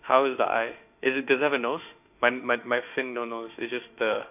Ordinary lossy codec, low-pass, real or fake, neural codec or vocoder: none; 3.6 kHz; fake; autoencoder, 48 kHz, 32 numbers a frame, DAC-VAE, trained on Japanese speech